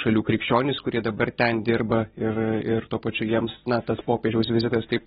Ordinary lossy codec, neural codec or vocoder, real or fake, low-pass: AAC, 16 kbps; none; real; 19.8 kHz